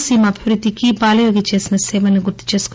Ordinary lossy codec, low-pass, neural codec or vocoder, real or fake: none; none; none; real